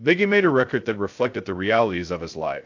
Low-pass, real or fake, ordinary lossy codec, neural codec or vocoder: 7.2 kHz; fake; AAC, 48 kbps; codec, 16 kHz, 0.2 kbps, FocalCodec